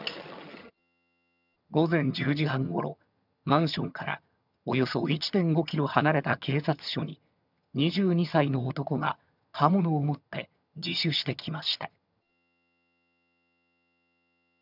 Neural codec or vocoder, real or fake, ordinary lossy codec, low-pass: vocoder, 22.05 kHz, 80 mel bands, HiFi-GAN; fake; none; 5.4 kHz